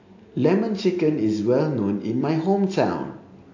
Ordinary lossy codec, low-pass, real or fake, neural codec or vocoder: AAC, 48 kbps; 7.2 kHz; real; none